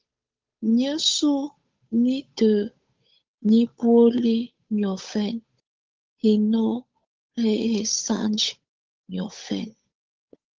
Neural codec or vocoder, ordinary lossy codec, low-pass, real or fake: codec, 16 kHz, 8 kbps, FunCodec, trained on Chinese and English, 25 frames a second; Opus, 32 kbps; 7.2 kHz; fake